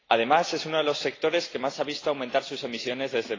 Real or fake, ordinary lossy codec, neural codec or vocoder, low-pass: real; AAC, 32 kbps; none; 7.2 kHz